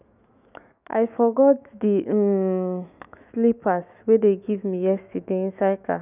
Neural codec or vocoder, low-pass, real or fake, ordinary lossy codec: autoencoder, 48 kHz, 128 numbers a frame, DAC-VAE, trained on Japanese speech; 3.6 kHz; fake; none